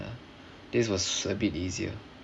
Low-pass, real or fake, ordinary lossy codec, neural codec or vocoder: none; real; none; none